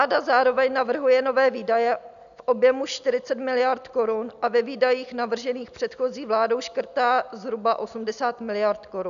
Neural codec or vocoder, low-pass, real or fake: none; 7.2 kHz; real